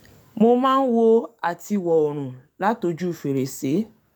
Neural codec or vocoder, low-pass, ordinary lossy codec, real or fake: codec, 44.1 kHz, 7.8 kbps, DAC; 19.8 kHz; none; fake